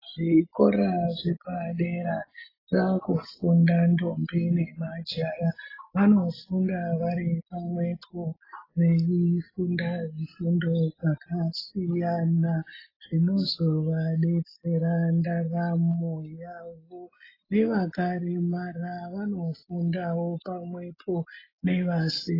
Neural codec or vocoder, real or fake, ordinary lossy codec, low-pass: none; real; AAC, 24 kbps; 5.4 kHz